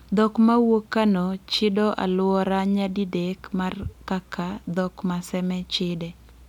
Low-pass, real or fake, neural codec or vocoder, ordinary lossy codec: 19.8 kHz; real; none; none